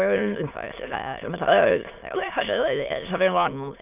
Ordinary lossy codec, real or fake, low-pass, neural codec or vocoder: none; fake; 3.6 kHz; autoencoder, 22.05 kHz, a latent of 192 numbers a frame, VITS, trained on many speakers